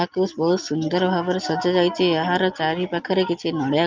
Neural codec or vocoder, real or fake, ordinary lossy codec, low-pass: none; real; Opus, 24 kbps; 7.2 kHz